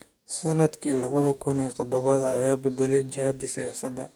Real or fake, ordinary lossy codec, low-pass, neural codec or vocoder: fake; none; none; codec, 44.1 kHz, 2.6 kbps, DAC